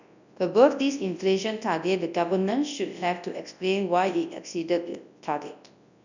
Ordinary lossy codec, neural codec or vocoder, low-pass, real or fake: none; codec, 24 kHz, 0.9 kbps, WavTokenizer, large speech release; 7.2 kHz; fake